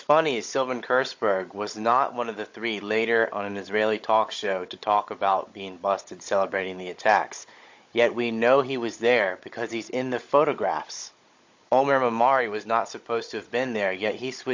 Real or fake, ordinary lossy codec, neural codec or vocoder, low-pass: fake; MP3, 48 kbps; codec, 16 kHz, 16 kbps, FunCodec, trained on Chinese and English, 50 frames a second; 7.2 kHz